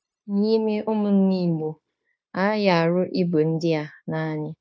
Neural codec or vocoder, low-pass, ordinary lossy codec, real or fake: codec, 16 kHz, 0.9 kbps, LongCat-Audio-Codec; none; none; fake